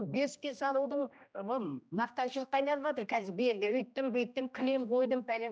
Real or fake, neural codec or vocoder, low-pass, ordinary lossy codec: fake; codec, 16 kHz, 1 kbps, X-Codec, HuBERT features, trained on general audio; none; none